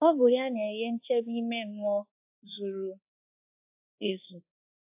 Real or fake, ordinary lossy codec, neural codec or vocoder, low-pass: fake; AAC, 32 kbps; codec, 24 kHz, 1.2 kbps, DualCodec; 3.6 kHz